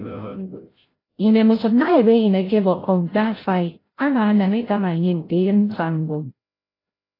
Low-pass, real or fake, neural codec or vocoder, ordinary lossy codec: 5.4 kHz; fake; codec, 16 kHz, 0.5 kbps, FreqCodec, larger model; AAC, 24 kbps